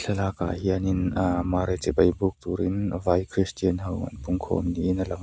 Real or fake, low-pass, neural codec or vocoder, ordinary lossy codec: real; none; none; none